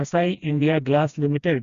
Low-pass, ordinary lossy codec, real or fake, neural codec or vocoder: 7.2 kHz; Opus, 64 kbps; fake; codec, 16 kHz, 1 kbps, FreqCodec, smaller model